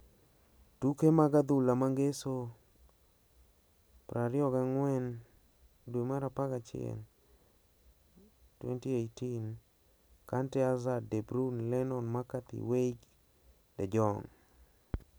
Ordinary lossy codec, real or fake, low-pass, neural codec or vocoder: none; real; none; none